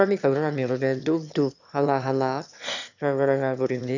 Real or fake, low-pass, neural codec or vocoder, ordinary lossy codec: fake; 7.2 kHz; autoencoder, 22.05 kHz, a latent of 192 numbers a frame, VITS, trained on one speaker; none